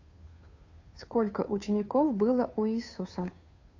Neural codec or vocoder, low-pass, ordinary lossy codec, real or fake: codec, 16 kHz, 2 kbps, FunCodec, trained on Chinese and English, 25 frames a second; 7.2 kHz; AAC, 48 kbps; fake